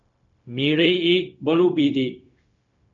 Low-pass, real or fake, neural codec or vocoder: 7.2 kHz; fake; codec, 16 kHz, 0.4 kbps, LongCat-Audio-Codec